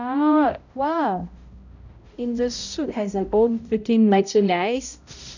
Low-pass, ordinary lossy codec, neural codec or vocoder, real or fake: 7.2 kHz; none; codec, 16 kHz, 0.5 kbps, X-Codec, HuBERT features, trained on balanced general audio; fake